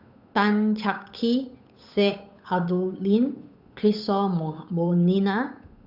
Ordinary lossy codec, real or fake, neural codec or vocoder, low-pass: none; fake; codec, 16 kHz, 8 kbps, FunCodec, trained on Chinese and English, 25 frames a second; 5.4 kHz